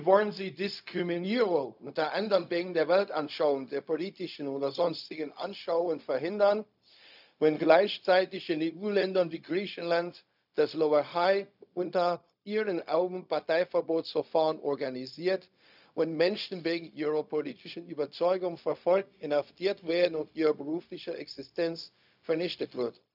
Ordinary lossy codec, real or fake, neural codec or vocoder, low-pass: none; fake; codec, 16 kHz, 0.4 kbps, LongCat-Audio-Codec; 5.4 kHz